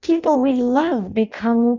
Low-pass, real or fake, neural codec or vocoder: 7.2 kHz; fake; codec, 16 kHz in and 24 kHz out, 0.6 kbps, FireRedTTS-2 codec